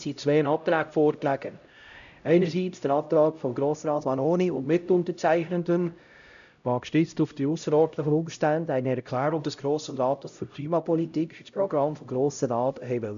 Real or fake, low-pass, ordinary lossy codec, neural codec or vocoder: fake; 7.2 kHz; MP3, 96 kbps; codec, 16 kHz, 0.5 kbps, X-Codec, HuBERT features, trained on LibriSpeech